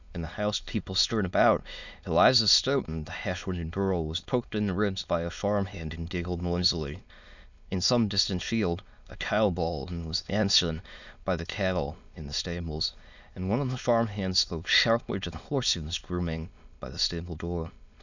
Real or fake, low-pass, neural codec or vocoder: fake; 7.2 kHz; autoencoder, 22.05 kHz, a latent of 192 numbers a frame, VITS, trained on many speakers